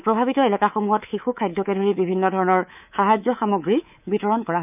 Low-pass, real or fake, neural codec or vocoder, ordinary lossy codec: 3.6 kHz; fake; codec, 24 kHz, 3.1 kbps, DualCodec; none